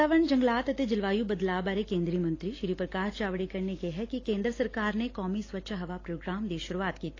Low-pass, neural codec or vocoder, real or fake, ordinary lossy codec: 7.2 kHz; none; real; AAC, 32 kbps